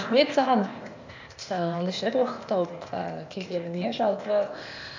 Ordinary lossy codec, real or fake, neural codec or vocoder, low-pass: MP3, 64 kbps; fake; codec, 16 kHz, 0.8 kbps, ZipCodec; 7.2 kHz